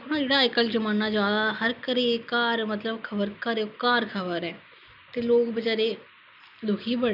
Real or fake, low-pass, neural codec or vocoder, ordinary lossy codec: real; 5.4 kHz; none; none